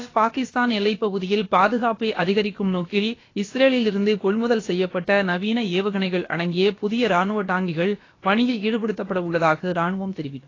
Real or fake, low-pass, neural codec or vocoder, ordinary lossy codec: fake; 7.2 kHz; codec, 16 kHz, about 1 kbps, DyCAST, with the encoder's durations; AAC, 32 kbps